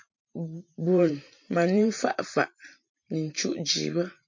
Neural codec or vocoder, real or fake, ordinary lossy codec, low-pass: vocoder, 44.1 kHz, 128 mel bands every 512 samples, BigVGAN v2; fake; MP3, 48 kbps; 7.2 kHz